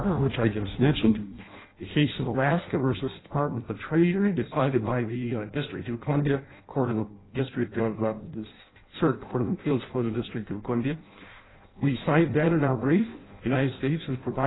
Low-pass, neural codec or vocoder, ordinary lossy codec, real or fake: 7.2 kHz; codec, 16 kHz in and 24 kHz out, 0.6 kbps, FireRedTTS-2 codec; AAC, 16 kbps; fake